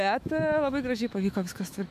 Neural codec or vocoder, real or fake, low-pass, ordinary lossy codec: autoencoder, 48 kHz, 128 numbers a frame, DAC-VAE, trained on Japanese speech; fake; 14.4 kHz; AAC, 96 kbps